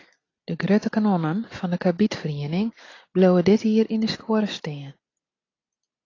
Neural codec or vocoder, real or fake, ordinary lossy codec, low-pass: none; real; AAC, 32 kbps; 7.2 kHz